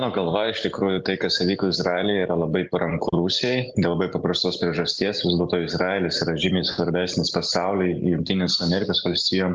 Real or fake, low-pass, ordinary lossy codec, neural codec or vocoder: real; 7.2 kHz; Opus, 24 kbps; none